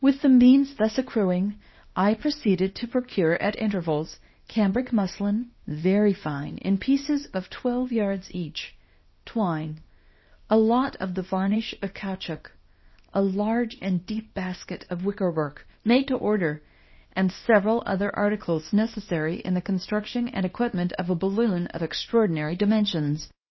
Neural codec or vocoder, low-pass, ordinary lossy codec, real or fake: codec, 24 kHz, 0.9 kbps, WavTokenizer, small release; 7.2 kHz; MP3, 24 kbps; fake